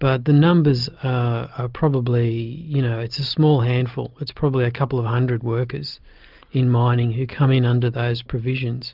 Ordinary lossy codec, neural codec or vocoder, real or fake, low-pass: Opus, 24 kbps; none; real; 5.4 kHz